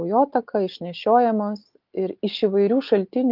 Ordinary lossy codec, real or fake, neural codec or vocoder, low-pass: Opus, 24 kbps; real; none; 5.4 kHz